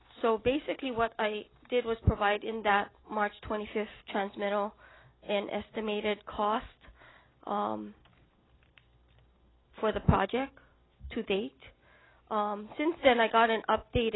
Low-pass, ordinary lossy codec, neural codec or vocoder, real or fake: 7.2 kHz; AAC, 16 kbps; none; real